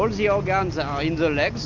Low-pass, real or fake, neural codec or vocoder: 7.2 kHz; real; none